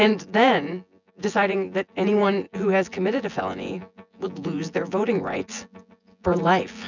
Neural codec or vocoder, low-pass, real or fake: vocoder, 24 kHz, 100 mel bands, Vocos; 7.2 kHz; fake